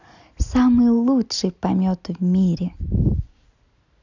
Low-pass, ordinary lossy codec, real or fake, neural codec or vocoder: 7.2 kHz; none; real; none